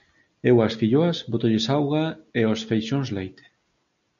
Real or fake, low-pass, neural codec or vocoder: real; 7.2 kHz; none